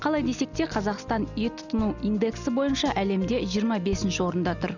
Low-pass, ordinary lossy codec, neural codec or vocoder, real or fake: 7.2 kHz; none; none; real